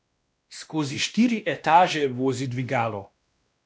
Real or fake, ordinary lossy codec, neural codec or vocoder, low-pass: fake; none; codec, 16 kHz, 1 kbps, X-Codec, WavLM features, trained on Multilingual LibriSpeech; none